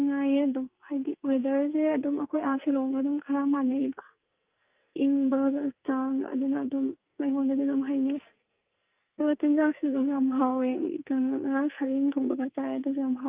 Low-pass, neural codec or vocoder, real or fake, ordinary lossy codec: 3.6 kHz; codec, 44.1 kHz, 2.6 kbps, SNAC; fake; Opus, 24 kbps